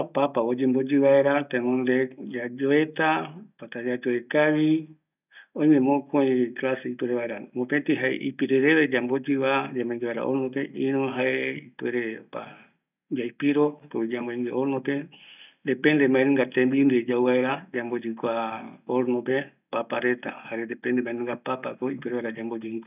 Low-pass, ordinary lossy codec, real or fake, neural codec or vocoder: 3.6 kHz; none; real; none